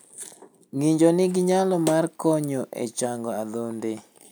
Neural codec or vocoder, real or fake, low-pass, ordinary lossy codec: none; real; none; none